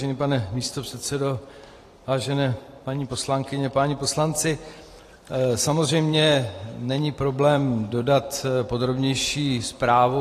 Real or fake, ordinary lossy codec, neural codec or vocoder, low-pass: fake; AAC, 48 kbps; vocoder, 44.1 kHz, 128 mel bands every 512 samples, BigVGAN v2; 14.4 kHz